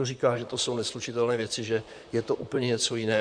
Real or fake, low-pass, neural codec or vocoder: fake; 9.9 kHz; vocoder, 44.1 kHz, 128 mel bands, Pupu-Vocoder